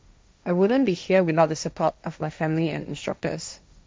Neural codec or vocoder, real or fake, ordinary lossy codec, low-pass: codec, 16 kHz, 1.1 kbps, Voila-Tokenizer; fake; none; none